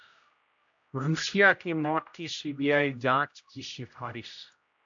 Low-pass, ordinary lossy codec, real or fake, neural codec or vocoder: 7.2 kHz; AAC, 64 kbps; fake; codec, 16 kHz, 0.5 kbps, X-Codec, HuBERT features, trained on general audio